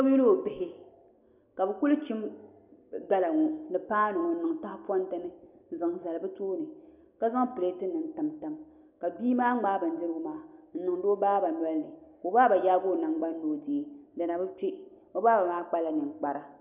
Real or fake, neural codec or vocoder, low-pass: real; none; 3.6 kHz